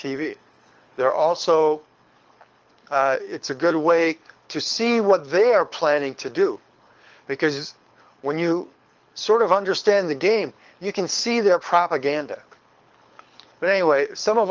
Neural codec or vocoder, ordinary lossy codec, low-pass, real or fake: codec, 24 kHz, 6 kbps, HILCodec; Opus, 24 kbps; 7.2 kHz; fake